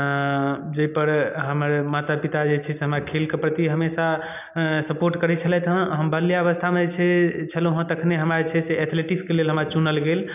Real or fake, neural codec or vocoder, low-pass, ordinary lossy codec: real; none; 3.6 kHz; none